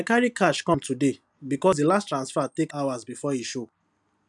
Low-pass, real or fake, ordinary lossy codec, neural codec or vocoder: 10.8 kHz; real; none; none